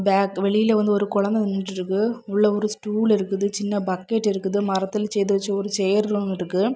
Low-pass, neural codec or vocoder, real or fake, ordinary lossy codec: none; none; real; none